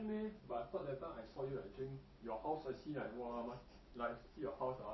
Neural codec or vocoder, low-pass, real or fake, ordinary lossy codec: none; 7.2 kHz; real; MP3, 24 kbps